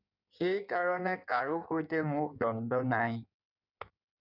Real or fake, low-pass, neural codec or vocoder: fake; 5.4 kHz; codec, 16 kHz in and 24 kHz out, 1.1 kbps, FireRedTTS-2 codec